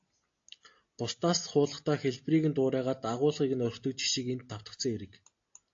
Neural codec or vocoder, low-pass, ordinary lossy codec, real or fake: none; 7.2 kHz; AAC, 48 kbps; real